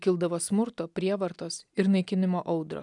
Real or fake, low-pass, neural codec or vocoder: real; 10.8 kHz; none